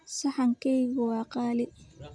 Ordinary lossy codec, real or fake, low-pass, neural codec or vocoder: none; real; 9.9 kHz; none